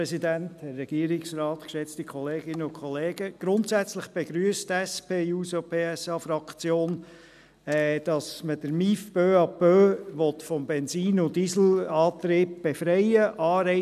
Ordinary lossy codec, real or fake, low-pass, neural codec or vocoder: none; real; 14.4 kHz; none